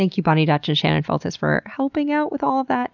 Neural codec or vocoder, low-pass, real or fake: none; 7.2 kHz; real